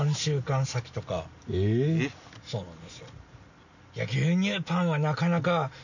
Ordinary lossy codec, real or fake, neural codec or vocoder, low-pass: none; real; none; 7.2 kHz